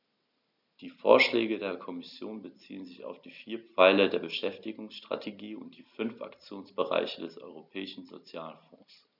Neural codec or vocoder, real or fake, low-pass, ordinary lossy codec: none; real; 5.4 kHz; none